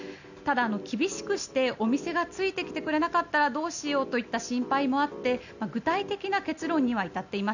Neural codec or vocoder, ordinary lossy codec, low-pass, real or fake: none; none; 7.2 kHz; real